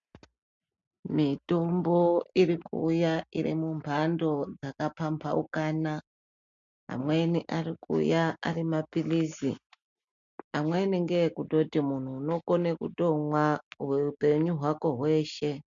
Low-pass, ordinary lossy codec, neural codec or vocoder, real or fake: 7.2 kHz; MP3, 64 kbps; none; real